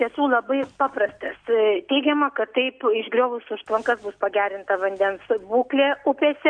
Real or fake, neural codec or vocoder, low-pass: real; none; 9.9 kHz